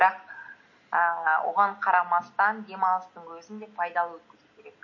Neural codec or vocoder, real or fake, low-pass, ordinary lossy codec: none; real; 7.2 kHz; MP3, 32 kbps